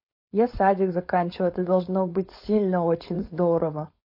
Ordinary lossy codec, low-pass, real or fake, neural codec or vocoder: MP3, 32 kbps; 5.4 kHz; fake; codec, 16 kHz, 4.8 kbps, FACodec